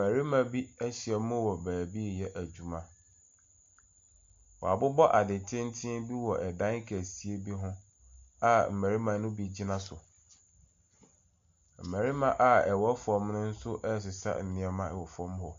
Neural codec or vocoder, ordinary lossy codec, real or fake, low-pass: none; MP3, 48 kbps; real; 7.2 kHz